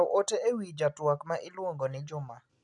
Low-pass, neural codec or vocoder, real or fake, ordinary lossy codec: 10.8 kHz; none; real; none